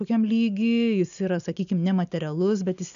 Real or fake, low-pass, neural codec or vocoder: real; 7.2 kHz; none